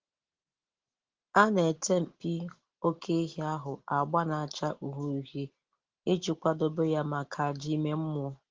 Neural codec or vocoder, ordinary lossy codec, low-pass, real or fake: none; Opus, 16 kbps; 7.2 kHz; real